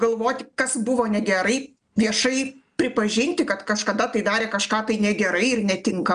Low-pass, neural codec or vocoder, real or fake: 9.9 kHz; none; real